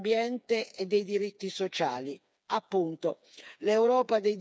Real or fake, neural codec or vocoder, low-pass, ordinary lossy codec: fake; codec, 16 kHz, 4 kbps, FreqCodec, smaller model; none; none